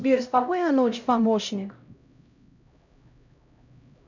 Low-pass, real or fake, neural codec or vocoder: 7.2 kHz; fake; codec, 16 kHz, 0.5 kbps, X-Codec, HuBERT features, trained on LibriSpeech